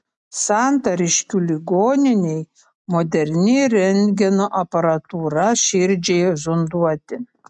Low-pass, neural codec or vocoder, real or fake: 10.8 kHz; none; real